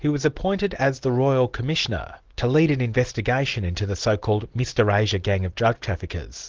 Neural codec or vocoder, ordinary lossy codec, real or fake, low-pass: none; Opus, 16 kbps; real; 7.2 kHz